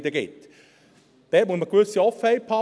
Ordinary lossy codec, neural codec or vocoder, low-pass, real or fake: none; none; none; real